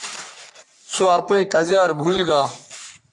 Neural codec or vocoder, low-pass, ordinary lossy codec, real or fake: codec, 44.1 kHz, 3.4 kbps, Pupu-Codec; 10.8 kHz; MP3, 96 kbps; fake